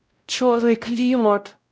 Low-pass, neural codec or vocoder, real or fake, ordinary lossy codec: none; codec, 16 kHz, 0.5 kbps, X-Codec, WavLM features, trained on Multilingual LibriSpeech; fake; none